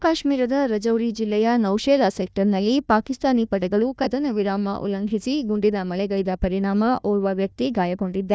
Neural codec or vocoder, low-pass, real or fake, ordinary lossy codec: codec, 16 kHz, 1 kbps, FunCodec, trained on Chinese and English, 50 frames a second; none; fake; none